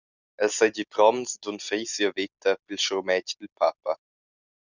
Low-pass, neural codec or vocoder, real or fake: 7.2 kHz; none; real